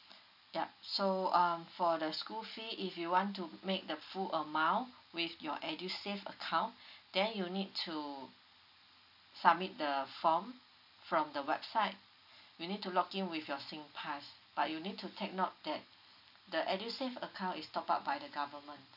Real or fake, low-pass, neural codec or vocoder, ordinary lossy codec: real; 5.4 kHz; none; none